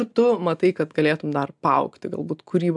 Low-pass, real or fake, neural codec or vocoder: 10.8 kHz; real; none